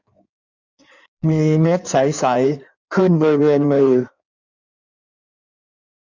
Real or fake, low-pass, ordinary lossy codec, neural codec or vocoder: fake; 7.2 kHz; none; codec, 16 kHz in and 24 kHz out, 1.1 kbps, FireRedTTS-2 codec